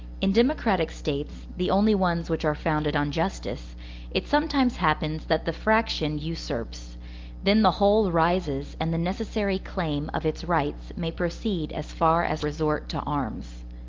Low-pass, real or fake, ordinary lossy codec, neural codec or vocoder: 7.2 kHz; real; Opus, 32 kbps; none